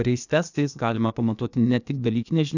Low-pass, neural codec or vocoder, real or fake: 7.2 kHz; codec, 16 kHz, 0.8 kbps, ZipCodec; fake